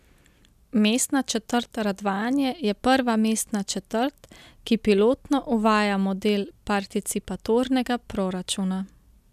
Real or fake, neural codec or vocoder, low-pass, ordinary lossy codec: real; none; 14.4 kHz; none